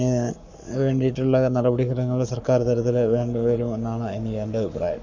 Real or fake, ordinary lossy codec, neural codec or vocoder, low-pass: fake; MP3, 64 kbps; codec, 44.1 kHz, 7.8 kbps, Pupu-Codec; 7.2 kHz